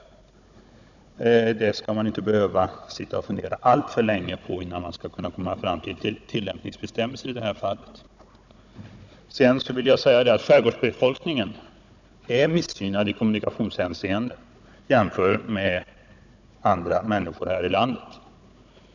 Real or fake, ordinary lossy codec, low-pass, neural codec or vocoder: fake; none; 7.2 kHz; codec, 16 kHz, 16 kbps, FunCodec, trained on Chinese and English, 50 frames a second